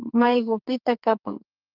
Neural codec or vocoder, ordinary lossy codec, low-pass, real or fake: codec, 44.1 kHz, 2.6 kbps, SNAC; Opus, 32 kbps; 5.4 kHz; fake